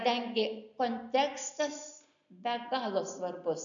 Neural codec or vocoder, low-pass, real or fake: none; 7.2 kHz; real